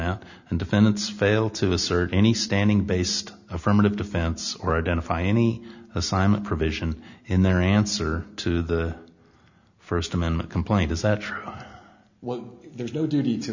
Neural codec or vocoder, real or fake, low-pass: none; real; 7.2 kHz